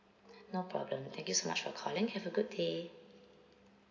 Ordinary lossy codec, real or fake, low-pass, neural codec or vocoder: none; real; 7.2 kHz; none